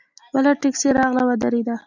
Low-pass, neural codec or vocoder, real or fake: 7.2 kHz; none; real